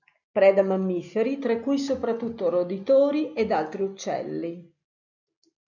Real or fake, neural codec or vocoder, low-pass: real; none; 7.2 kHz